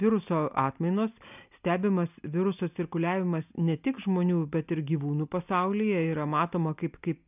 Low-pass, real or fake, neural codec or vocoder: 3.6 kHz; real; none